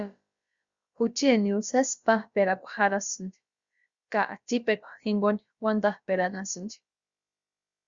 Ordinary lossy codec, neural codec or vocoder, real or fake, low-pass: Opus, 64 kbps; codec, 16 kHz, about 1 kbps, DyCAST, with the encoder's durations; fake; 7.2 kHz